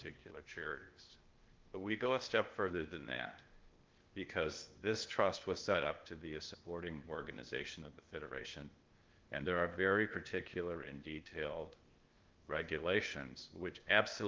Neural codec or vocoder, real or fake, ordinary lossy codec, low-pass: codec, 16 kHz, 0.8 kbps, ZipCodec; fake; Opus, 32 kbps; 7.2 kHz